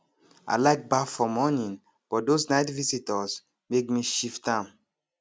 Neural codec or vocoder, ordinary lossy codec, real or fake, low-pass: none; none; real; none